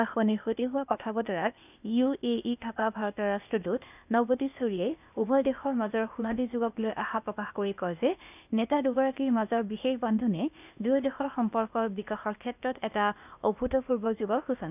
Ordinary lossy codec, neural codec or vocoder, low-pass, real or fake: none; codec, 16 kHz, 0.8 kbps, ZipCodec; 3.6 kHz; fake